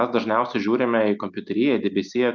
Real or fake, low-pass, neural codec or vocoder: real; 7.2 kHz; none